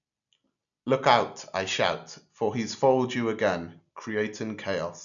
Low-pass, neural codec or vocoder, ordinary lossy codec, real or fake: 7.2 kHz; none; none; real